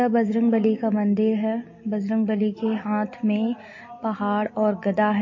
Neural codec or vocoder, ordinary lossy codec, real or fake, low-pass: none; MP3, 32 kbps; real; 7.2 kHz